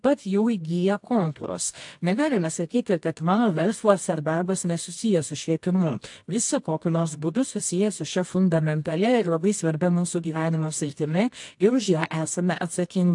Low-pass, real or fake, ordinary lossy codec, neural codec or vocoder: 10.8 kHz; fake; AAC, 64 kbps; codec, 24 kHz, 0.9 kbps, WavTokenizer, medium music audio release